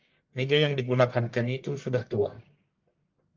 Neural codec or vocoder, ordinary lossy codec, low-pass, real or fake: codec, 44.1 kHz, 1.7 kbps, Pupu-Codec; Opus, 32 kbps; 7.2 kHz; fake